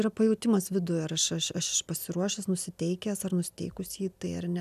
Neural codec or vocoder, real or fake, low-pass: none; real; 14.4 kHz